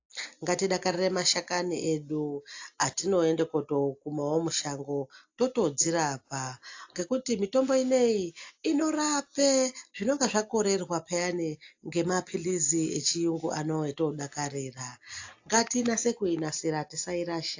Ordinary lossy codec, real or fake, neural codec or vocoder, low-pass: AAC, 48 kbps; real; none; 7.2 kHz